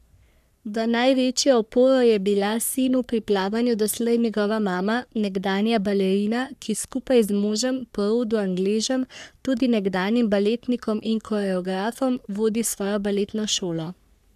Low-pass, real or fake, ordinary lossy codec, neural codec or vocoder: 14.4 kHz; fake; none; codec, 44.1 kHz, 3.4 kbps, Pupu-Codec